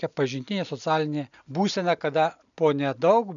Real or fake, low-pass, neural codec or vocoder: real; 7.2 kHz; none